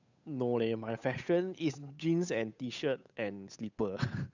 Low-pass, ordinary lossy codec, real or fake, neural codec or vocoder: 7.2 kHz; none; fake; codec, 16 kHz, 8 kbps, FunCodec, trained on Chinese and English, 25 frames a second